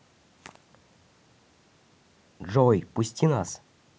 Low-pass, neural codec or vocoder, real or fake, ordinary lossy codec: none; none; real; none